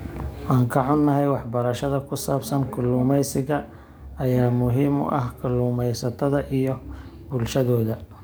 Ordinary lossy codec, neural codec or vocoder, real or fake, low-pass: none; codec, 44.1 kHz, 7.8 kbps, DAC; fake; none